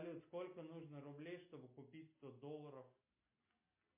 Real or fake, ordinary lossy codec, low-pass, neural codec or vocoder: real; MP3, 32 kbps; 3.6 kHz; none